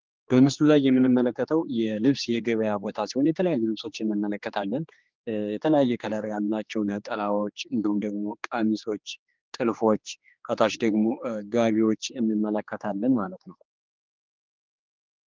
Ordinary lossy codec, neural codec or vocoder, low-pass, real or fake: Opus, 32 kbps; codec, 16 kHz, 2 kbps, X-Codec, HuBERT features, trained on general audio; 7.2 kHz; fake